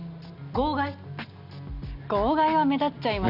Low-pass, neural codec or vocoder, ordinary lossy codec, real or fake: 5.4 kHz; none; none; real